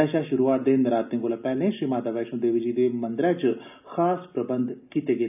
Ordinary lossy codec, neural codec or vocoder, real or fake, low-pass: none; none; real; 3.6 kHz